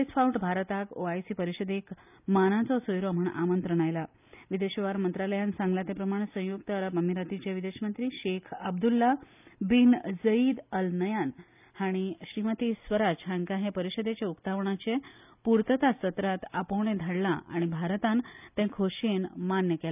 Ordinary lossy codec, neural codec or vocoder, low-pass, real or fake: none; none; 3.6 kHz; real